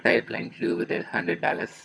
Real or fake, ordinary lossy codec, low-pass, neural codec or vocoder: fake; none; none; vocoder, 22.05 kHz, 80 mel bands, HiFi-GAN